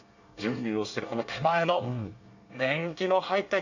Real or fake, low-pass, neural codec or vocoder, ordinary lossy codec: fake; 7.2 kHz; codec, 24 kHz, 1 kbps, SNAC; none